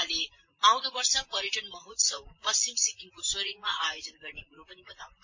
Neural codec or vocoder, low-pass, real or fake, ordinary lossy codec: vocoder, 44.1 kHz, 128 mel bands, Pupu-Vocoder; 7.2 kHz; fake; MP3, 32 kbps